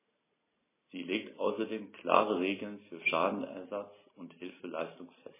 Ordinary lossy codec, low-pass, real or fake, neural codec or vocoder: MP3, 16 kbps; 3.6 kHz; real; none